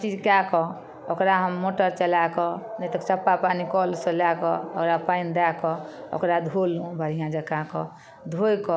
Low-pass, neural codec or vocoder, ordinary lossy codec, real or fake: none; none; none; real